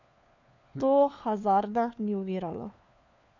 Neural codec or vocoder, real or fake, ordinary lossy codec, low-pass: codec, 16 kHz, 4 kbps, FunCodec, trained on LibriTTS, 50 frames a second; fake; none; 7.2 kHz